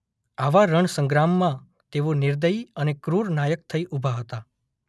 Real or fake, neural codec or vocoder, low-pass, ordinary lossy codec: real; none; none; none